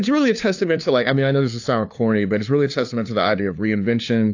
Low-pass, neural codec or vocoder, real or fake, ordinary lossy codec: 7.2 kHz; codec, 16 kHz, 2 kbps, FunCodec, trained on LibriTTS, 25 frames a second; fake; AAC, 48 kbps